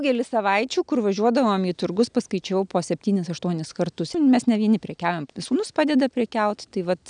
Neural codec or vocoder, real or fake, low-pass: none; real; 9.9 kHz